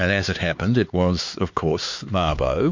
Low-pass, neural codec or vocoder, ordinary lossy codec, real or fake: 7.2 kHz; codec, 16 kHz, 4 kbps, X-Codec, HuBERT features, trained on LibriSpeech; MP3, 48 kbps; fake